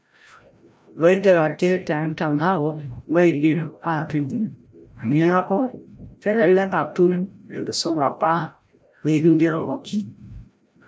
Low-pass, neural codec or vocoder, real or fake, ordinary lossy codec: none; codec, 16 kHz, 0.5 kbps, FreqCodec, larger model; fake; none